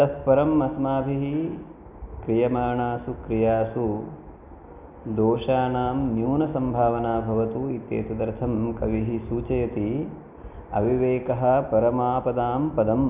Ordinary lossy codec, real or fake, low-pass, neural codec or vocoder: none; real; 3.6 kHz; none